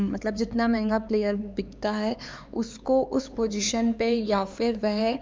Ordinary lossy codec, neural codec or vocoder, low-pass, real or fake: none; codec, 16 kHz, 4 kbps, X-Codec, HuBERT features, trained on LibriSpeech; none; fake